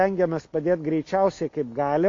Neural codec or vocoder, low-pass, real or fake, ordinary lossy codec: none; 7.2 kHz; real; AAC, 48 kbps